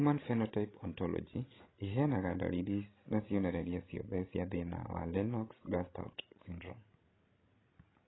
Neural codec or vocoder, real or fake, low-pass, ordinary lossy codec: codec, 16 kHz, 16 kbps, FreqCodec, larger model; fake; 7.2 kHz; AAC, 16 kbps